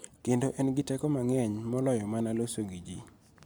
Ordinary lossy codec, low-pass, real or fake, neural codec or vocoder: none; none; real; none